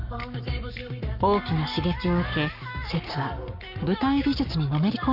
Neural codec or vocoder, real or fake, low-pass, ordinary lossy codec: codec, 44.1 kHz, 7.8 kbps, Pupu-Codec; fake; 5.4 kHz; none